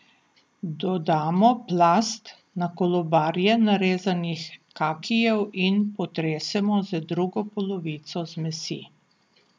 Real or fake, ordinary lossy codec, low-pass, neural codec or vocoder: real; none; none; none